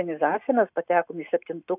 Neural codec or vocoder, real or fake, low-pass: none; real; 3.6 kHz